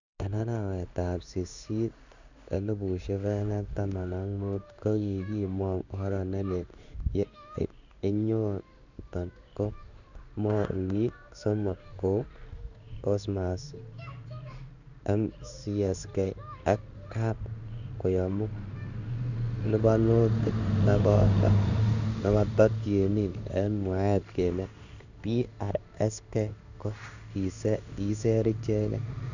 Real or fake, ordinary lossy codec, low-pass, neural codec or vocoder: fake; none; 7.2 kHz; codec, 16 kHz in and 24 kHz out, 1 kbps, XY-Tokenizer